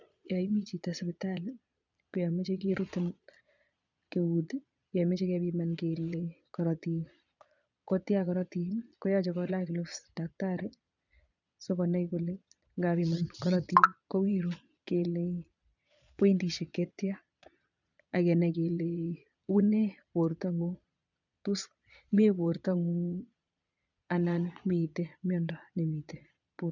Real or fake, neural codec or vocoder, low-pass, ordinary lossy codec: fake; vocoder, 22.05 kHz, 80 mel bands, Vocos; 7.2 kHz; none